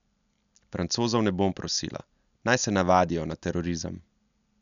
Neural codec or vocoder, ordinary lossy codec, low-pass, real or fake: none; none; 7.2 kHz; real